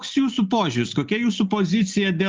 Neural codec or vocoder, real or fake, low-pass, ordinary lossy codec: none; real; 7.2 kHz; Opus, 24 kbps